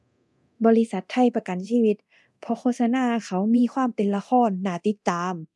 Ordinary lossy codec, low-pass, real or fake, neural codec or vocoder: none; none; fake; codec, 24 kHz, 0.9 kbps, DualCodec